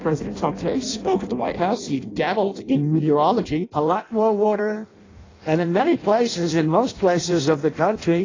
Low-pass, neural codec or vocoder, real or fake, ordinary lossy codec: 7.2 kHz; codec, 16 kHz in and 24 kHz out, 0.6 kbps, FireRedTTS-2 codec; fake; AAC, 32 kbps